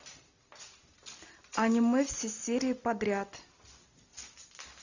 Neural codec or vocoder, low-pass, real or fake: none; 7.2 kHz; real